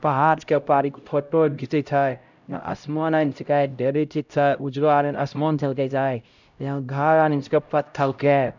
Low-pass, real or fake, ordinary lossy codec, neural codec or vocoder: 7.2 kHz; fake; none; codec, 16 kHz, 0.5 kbps, X-Codec, HuBERT features, trained on LibriSpeech